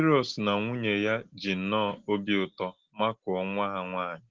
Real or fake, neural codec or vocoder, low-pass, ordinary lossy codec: real; none; 7.2 kHz; Opus, 16 kbps